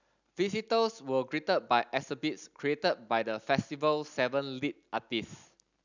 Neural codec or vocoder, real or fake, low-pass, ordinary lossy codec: none; real; 7.2 kHz; none